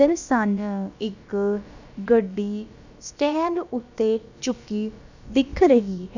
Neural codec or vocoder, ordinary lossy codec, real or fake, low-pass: codec, 16 kHz, about 1 kbps, DyCAST, with the encoder's durations; none; fake; 7.2 kHz